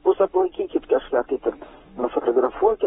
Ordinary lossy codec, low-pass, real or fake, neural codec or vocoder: AAC, 16 kbps; 19.8 kHz; fake; codec, 44.1 kHz, 7.8 kbps, Pupu-Codec